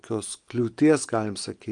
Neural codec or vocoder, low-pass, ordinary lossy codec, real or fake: none; 9.9 kHz; Opus, 32 kbps; real